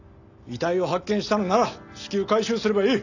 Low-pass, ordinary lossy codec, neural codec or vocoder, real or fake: 7.2 kHz; none; none; real